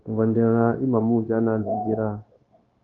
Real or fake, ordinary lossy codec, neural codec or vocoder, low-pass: fake; Opus, 32 kbps; codec, 16 kHz, 0.9 kbps, LongCat-Audio-Codec; 7.2 kHz